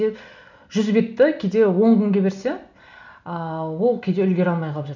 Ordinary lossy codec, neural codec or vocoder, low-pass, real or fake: none; none; 7.2 kHz; real